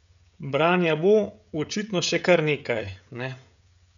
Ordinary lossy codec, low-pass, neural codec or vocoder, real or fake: none; 7.2 kHz; codec, 16 kHz, 16 kbps, FreqCodec, smaller model; fake